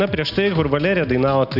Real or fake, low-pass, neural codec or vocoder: real; 5.4 kHz; none